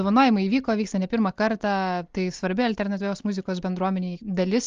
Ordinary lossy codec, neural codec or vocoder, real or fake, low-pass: Opus, 32 kbps; none; real; 7.2 kHz